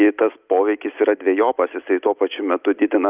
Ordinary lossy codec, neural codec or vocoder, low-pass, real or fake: Opus, 32 kbps; none; 3.6 kHz; real